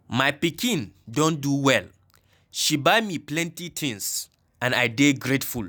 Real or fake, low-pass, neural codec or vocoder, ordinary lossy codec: real; none; none; none